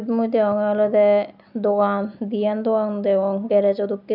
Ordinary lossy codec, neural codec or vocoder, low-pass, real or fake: none; none; 5.4 kHz; real